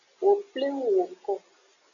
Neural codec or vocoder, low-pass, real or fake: none; 7.2 kHz; real